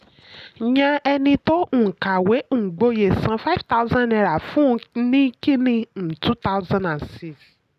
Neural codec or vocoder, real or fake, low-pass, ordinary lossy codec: none; real; 14.4 kHz; none